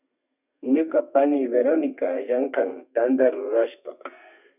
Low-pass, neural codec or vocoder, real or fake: 3.6 kHz; codec, 32 kHz, 1.9 kbps, SNAC; fake